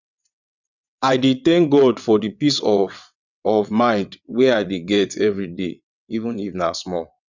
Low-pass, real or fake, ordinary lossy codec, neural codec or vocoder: 7.2 kHz; fake; none; vocoder, 22.05 kHz, 80 mel bands, WaveNeXt